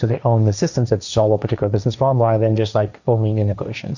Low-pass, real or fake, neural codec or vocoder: 7.2 kHz; fake; codec, 16 kHz, 1.1 kbps, Voila-Tokenizer